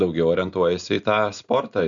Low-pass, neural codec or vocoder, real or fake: 7.2 kHz; none; real